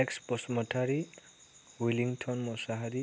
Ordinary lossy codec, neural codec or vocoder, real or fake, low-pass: none; none; real; none